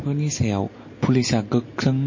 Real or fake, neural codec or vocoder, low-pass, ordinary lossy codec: real; none; 7.2 kHz; MP3, 32 kbps